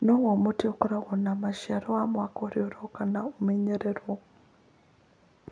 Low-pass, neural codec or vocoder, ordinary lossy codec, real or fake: 9.9 kHz; none; none; real